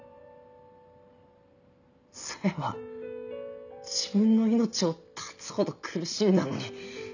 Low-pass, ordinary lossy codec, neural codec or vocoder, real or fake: 7.2 kHz; none; none; real